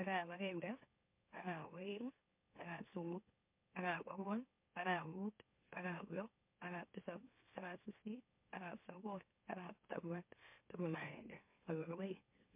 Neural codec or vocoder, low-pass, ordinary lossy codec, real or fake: autoencoder, 44.1 kHz, a latent of 192 numbers a frame, MeloTTS; 3.6 kHz; MP3, 32 kbps; fake